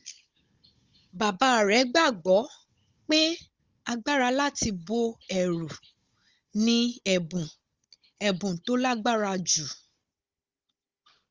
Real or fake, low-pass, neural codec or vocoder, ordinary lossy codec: fake; 7.2 kHz; codec, 16 kHz, 16 kbps, FunCodec, trained on Chinese and English, 50 frames a second; Opus, 32 kbps